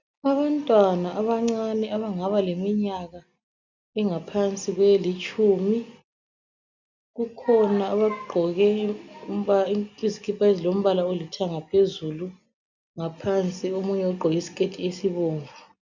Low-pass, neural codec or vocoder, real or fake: 7.2 kHz; none; real